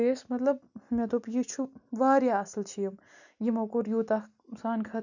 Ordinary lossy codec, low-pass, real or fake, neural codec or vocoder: none; 7.2 kHz; real; none